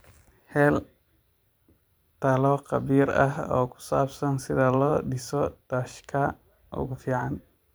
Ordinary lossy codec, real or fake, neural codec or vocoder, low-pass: none; fake; vocoder, 44.1 kHz, 128 mel bands every 512 samples, BigVGAN v2; none